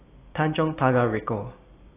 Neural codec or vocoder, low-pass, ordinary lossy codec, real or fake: none; 3.6 kHz; AAC, 16 kbps; real